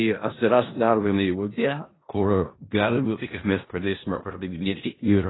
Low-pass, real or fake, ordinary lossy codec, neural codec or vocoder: 7.2 kHz; fake; AAC, 16 kbps; codec, 16 kHz in and 24 kHz out, 0.4 kbps, LongCat-Audio-Codec, four codebook decoder